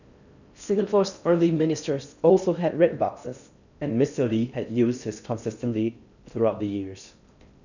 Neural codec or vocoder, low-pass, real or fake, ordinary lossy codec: codec, 16 kHz in and 24 kHz out, 0.6 kbps, FocalCodec, streaming, 4096 codes; 7.2 kHz; fake; none